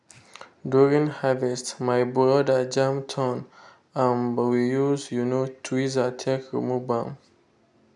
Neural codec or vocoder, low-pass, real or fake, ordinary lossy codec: none; 10.8 kHz; real; none